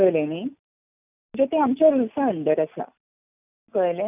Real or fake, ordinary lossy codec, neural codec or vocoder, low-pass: real; none; none; 3.6 kHz